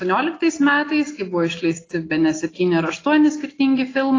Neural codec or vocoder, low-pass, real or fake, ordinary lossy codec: none; 7.2 kHz; real; AAC, 32 kbps